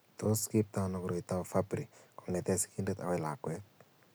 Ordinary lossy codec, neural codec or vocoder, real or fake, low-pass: none; none; real; none